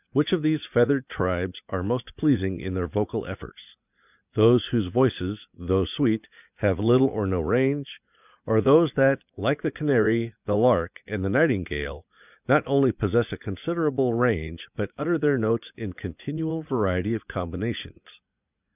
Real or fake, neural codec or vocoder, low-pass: fake; vocoder, 44.1 kHz, 80 mel bands, Vocos; 3.6 kHz